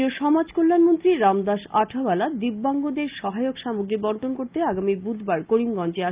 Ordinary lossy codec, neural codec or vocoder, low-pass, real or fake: Opus, 24 kbps; none; 3.6 kHz; real